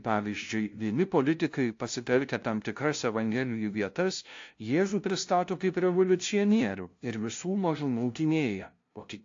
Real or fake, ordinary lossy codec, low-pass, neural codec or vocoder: fake; AAC, 48 kbps; 7.2 kHz; codec, 16 kHz, 0.5 kbps, FunCodec, trained on LibriTTS, 25 frames a second